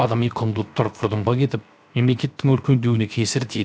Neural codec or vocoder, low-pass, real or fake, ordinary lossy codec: codec, 16 kHz, 0.7 kbps, FocalCodec; none; fake; none